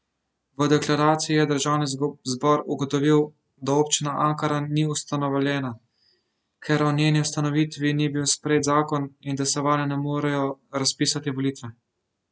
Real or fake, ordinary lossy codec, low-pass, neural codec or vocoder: real; none; none; none